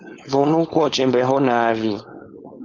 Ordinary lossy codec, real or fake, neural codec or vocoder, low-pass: Opus, 32 kbps; fake; codec, 16 kHz, 4.8 kbps, FACodec; 7.2 kHz